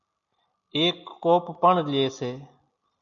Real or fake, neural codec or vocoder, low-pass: real; none; 7.2 kHz